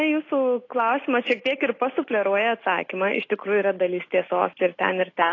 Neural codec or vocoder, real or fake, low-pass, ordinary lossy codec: none; real; 7.2 kHz; AAC, 32 kbps